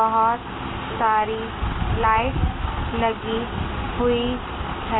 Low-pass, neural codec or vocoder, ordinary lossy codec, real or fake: 7.2 kHz; none; AAC, 16 kbps; real